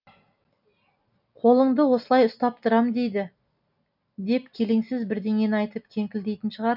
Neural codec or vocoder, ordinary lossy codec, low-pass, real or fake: none; none; 5.4 kHz; real